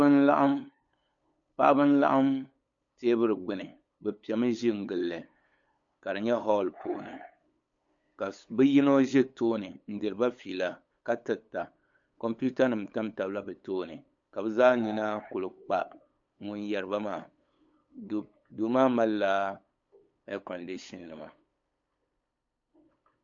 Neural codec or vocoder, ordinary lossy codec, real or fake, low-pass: codec, 16 kHz, 8 kbps, FunCodec, trained on LibriTTS, 25 frames a second; AAC, 64 kbps; fake; 7.2 kHz